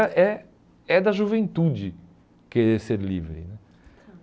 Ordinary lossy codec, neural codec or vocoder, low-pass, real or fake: none; none; none; real